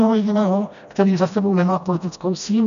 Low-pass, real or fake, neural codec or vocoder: 7.2 kHz; fake; codec, 16 kHz, 1 kbps, FreqCodec, smaller model